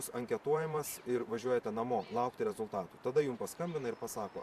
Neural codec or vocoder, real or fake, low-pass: vocoder, 44.1 kHz, 128 mel bands every 512 samples, BigVGAN v2; fake; 14.4 kHz